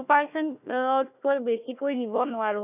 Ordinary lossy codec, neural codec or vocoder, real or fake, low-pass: none; codec, 16 kHz, 1 kbps, FunCodec, trained on Chinese and English, 50 frames a second; fake; 3.6 kHz